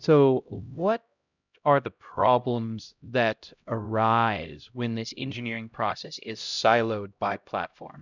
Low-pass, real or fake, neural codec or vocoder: 7.2 kHz; fake; codec, 16 kHz, 0.5 kbps, X-Codec, HuBERT features, trained on LibriSpeech